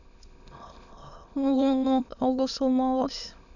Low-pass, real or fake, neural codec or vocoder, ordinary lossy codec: 7.2 kHz; fake; autoencoder, 22.05 kHz, a latent of 192 numbers a frame, VITS, trained on many speakers; none